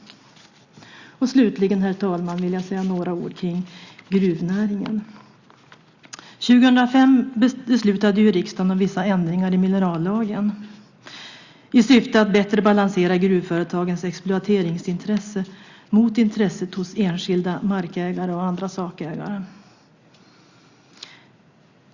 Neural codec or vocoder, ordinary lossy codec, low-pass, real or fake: none; Opus, 64 kbps; 7.2 kHz; real